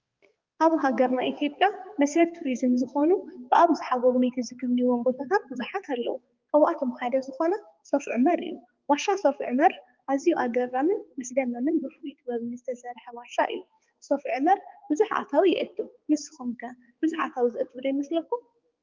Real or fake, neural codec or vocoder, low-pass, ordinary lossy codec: fake; codec, 16 kHz, 4 kbps, X-Codec, HuBERT features, trained on balanced general audio; 7.2 kHz; Opus, 32 kbps